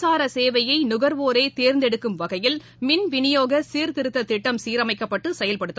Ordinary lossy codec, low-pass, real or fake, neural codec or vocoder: none; none; real; none